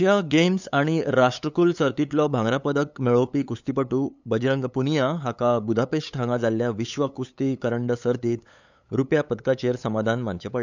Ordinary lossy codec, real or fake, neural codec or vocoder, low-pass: none; fake; codec, 16 kHz, 8 kbps, FunCodec, trained on LibriTTS, 25 frames a second; 7.2 kHz